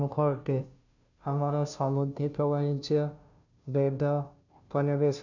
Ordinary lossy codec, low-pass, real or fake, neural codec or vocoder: none; 7.2 kHz; fake; codec, 16 kHz, 0.5 kbps, FunCodec, trained on Chinese and English, 25 frames a second